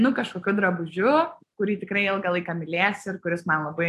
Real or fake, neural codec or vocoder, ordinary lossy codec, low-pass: real; none; AAC, 64 kbps; 14.4 kHz